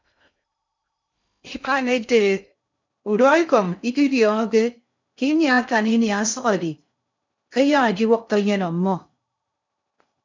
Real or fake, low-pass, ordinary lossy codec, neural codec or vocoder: fake; 7.2 kHz; MP3, 64 kbps; codec, 16 kHz in and 24 kHz out, 0.6 kbps, FocalCodec, streaming, 2048 codes